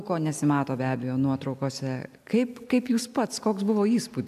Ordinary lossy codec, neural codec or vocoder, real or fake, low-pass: AAC, 96 kbps; none; real; 14.4 kHz